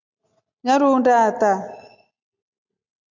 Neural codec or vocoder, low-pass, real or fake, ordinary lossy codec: none; 7.2 kHz; real; MP3, 64 kbps